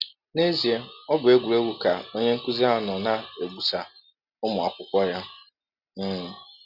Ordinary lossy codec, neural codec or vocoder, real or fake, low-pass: Opus, 64 kbps; none; real; 5.4 kHz